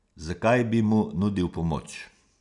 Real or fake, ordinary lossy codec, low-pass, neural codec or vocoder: real; none; 10.8 kHz; none